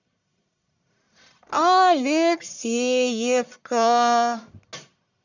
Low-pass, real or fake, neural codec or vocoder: 7.2 kHz; fake; codec, 44.1 kHz, 1.7 kbps, Pupu-Codec